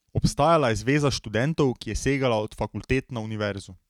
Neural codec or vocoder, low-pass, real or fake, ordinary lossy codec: none; 19.8 kHz; real; none